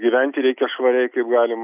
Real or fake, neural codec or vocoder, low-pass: real; none; 3.6 kHz